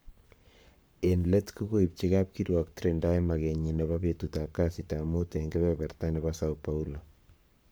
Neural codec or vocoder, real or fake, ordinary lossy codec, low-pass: codec, 44.1 kHz, 7.8 kbps, Pupu-Codec; fake; none; none